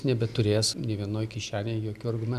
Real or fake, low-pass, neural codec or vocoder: real; 14.4 kHz; none